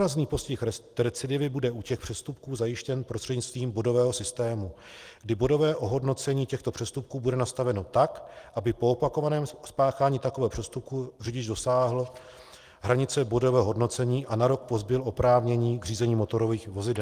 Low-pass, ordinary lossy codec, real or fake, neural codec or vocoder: 14.4 kHz; Opus, 24 kbps; real; none